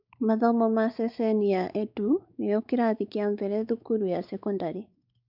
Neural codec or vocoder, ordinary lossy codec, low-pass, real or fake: codec, 16 kHz, 4 kbps, X-Codec, WavLM features, trained on Multilingual LibriSpeech; none; 5.4 kHz; fake